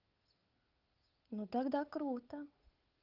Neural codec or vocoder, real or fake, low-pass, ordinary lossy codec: codec, 16 kHz, 8 kbps, FunCodec, trained on Chinese and English, 25 frames a second; fake; 5.4 kHz; Opus, 32 kbps